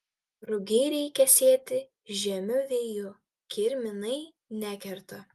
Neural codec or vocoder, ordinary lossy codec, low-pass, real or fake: none; Opus, 32 kbps; 14.4 kHz; real